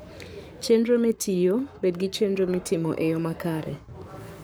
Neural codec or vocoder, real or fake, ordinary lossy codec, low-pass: codec, 44.1 kHz, 7.8 kbps, Pupu-Codec; fake; none; none